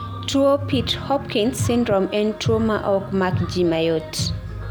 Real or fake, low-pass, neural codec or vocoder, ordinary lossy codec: real; none; none; none